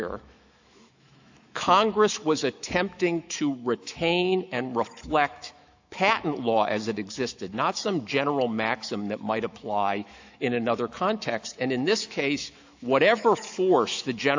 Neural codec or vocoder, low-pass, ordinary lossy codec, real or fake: autoencoder, 48 kHz, 128 numbers a frame, DAC-VAE, trained on Japanese speech; 7.2 kHz; AAC, 48 kbps; fake